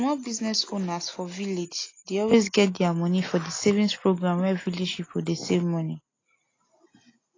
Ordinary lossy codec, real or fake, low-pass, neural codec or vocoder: AAC, 32 kbps; real; 7.2 kHz; none